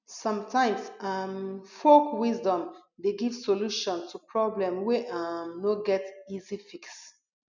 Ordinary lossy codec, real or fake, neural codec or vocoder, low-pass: none; real; none; 7.2 kHz